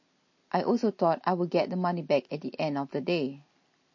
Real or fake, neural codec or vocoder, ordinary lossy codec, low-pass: real; none; MP3, 32 kbps; 7.2 kHz